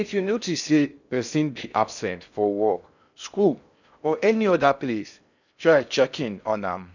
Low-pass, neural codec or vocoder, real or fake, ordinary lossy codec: 7.2 kHz; codec, 16 kHz in and 24 kHz out, 0.6 kbps, FocalCodec, streaming, 4096 codes; fake; none